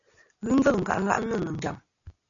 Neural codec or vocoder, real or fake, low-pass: none; real; 7.2 kHz